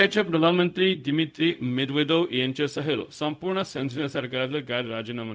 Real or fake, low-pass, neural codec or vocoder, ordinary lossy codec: fake; none; codec, 16 kHz, 0.4 kbps, LongCat-Audio-Codec; none